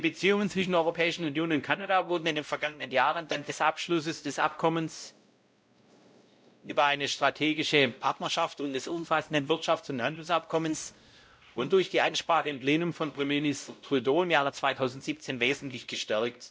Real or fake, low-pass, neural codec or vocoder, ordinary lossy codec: fake; none; codec, 16 kHz, 0.5 kbps, X-Codec, WavLM features, trained on Multilingual LibriSpeech; none